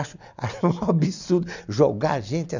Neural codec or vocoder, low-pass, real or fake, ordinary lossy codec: none; 7.2 kHz; real; none